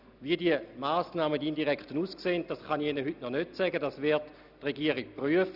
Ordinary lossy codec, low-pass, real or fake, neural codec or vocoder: none; 5.4 kHz; real; none